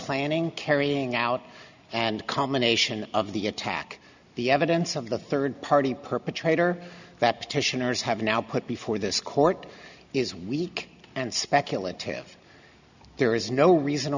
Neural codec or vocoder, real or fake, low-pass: none; real; 7.2 kHz